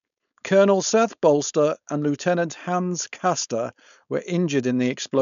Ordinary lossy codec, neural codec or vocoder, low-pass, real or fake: none; codec, 16 kHz, 4.8 kbps, FACodec; 7.2 kHz; fake